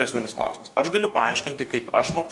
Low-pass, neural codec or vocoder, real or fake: 10.8 kHz; codec, 44.1 kHz, 2.6 kbps, DAC; fake